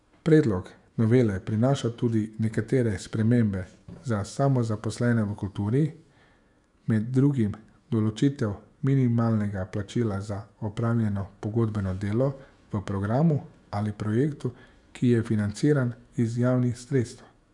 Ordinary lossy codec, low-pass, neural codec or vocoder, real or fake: none; 10.8 kHz; autoencoder, 48 kHz, 128 numbers a frame, DAC-VAE, trained on Japanese speech; fake